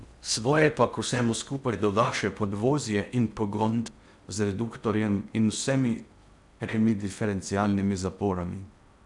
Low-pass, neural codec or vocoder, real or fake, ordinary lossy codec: 10.8 kHz; codec, 16 kHz in and 24 kHz out, 0.6 kbps, FocalCodec, streaming, 4096 codes; fake; none